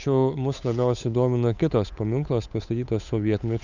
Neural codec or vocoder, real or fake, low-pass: codec, 16 kHz, 8 kbps, FunCodec, trained on Chinese and English, 25 frames a second; fake; 7.2 kHz